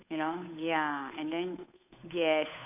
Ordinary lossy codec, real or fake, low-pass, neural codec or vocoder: none; fake; 3.6 kHz; codec, 16 kHz, 8 kbps, FunCodec, trained on Chinese and English, 25 frames a second